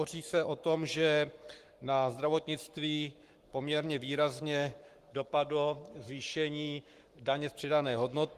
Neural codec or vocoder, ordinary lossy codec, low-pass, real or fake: codec, 44.1 kHz, 7.8 kbps, Pupu-Codec; Opus, 24 kbps; 14.4 kHz; fake